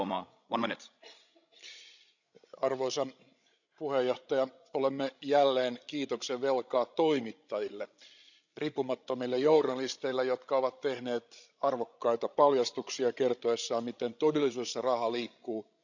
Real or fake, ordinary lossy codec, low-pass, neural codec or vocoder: fake; none; 7.2 kHz; codec, 16 kHz, 16 kbps, FreqCodec, larger model